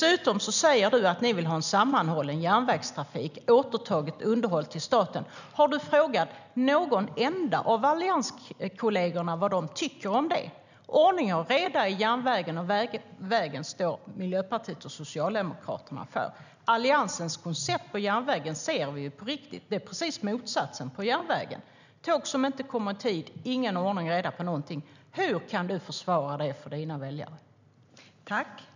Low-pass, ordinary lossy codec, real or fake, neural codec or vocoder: 7.2 kHz; none; real; none